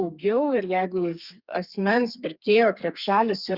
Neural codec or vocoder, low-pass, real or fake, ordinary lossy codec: codec, 32 kHz, 1.9 kbps, SNAC; 5.4 kHz; fake; Opus, 64 kbps